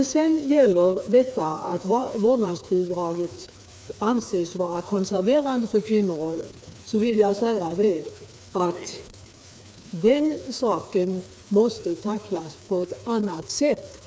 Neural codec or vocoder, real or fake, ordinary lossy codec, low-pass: codec, 16 kHz, 2 kbps, FreqCodec, larger model; fake; none; none